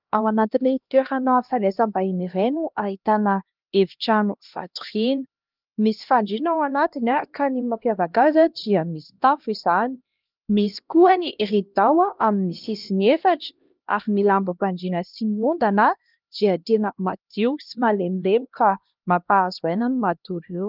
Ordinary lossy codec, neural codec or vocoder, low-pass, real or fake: Opus, 24 kbps; codec, 16 kHz, 1 kbps, X-Codec, HuBERT features, trained on LibriSpeech; 5.4 kHz; fake